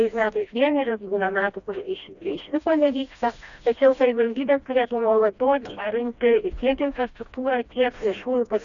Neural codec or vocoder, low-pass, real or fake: codec, 16 kHz, 1 kbps, FreqCodec, smaller model; 7.2 kHz; fake